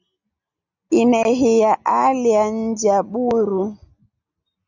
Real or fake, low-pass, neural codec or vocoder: real; 7.2 kHz; none